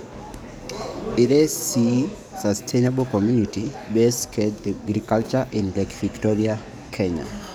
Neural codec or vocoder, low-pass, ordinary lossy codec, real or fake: codec, 44.1 kHz, 7.8 kbps, DAC; none; none; fake